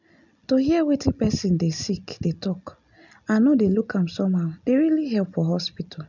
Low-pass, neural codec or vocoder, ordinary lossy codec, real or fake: 7.2 kHz; none; none; real